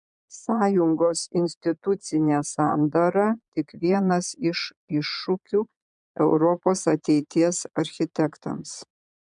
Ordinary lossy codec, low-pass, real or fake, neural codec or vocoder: MP3, 96 kbps; 9.9 kHz; fake; vocoder, 22.05 kHz, 80 mel bands, Vocos